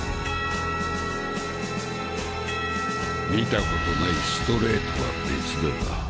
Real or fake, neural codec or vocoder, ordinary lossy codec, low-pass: real; none; none; none